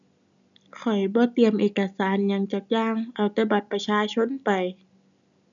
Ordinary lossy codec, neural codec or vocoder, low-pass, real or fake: none; none; 7.2 kHz; real